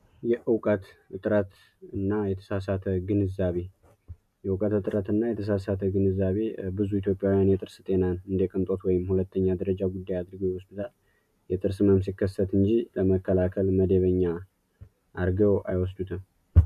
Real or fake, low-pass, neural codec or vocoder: real; 14.4 kHz; none